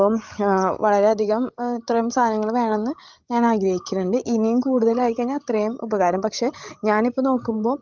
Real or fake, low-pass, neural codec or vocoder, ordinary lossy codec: fake; 7.2 kHz; codec, 16 kHz, 16 kbps, FreqCodec, larger model; Opus, 32 kbps